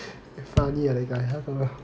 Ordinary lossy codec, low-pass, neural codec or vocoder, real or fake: none; none; none; real